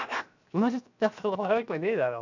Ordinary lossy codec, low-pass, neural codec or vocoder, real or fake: none; 7.2 kHz; codec, 16 kHz, 0.7 kbps, FocalCodec; fake